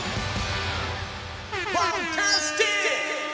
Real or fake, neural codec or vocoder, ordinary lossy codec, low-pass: real; none; none; none